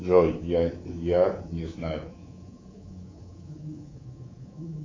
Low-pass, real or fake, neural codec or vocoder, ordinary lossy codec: 7.2 kHz; fake; codec, 24 kHz, 3.1 kbps, DualCodec; AAC, 32 kbps